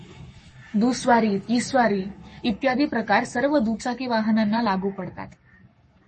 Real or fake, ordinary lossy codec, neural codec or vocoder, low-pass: fake; MP3, 32 kbps; codec, 44.1 kHz, 7.8 kbps, Pupu-Codec; 10.8 kHz